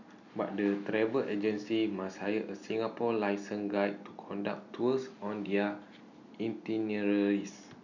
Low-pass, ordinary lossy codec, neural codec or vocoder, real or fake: 7.2 kHz; none; none; real